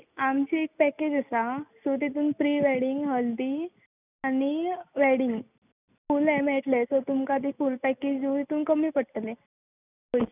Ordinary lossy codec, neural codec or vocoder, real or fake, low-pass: none; none; real; 3.6 kHz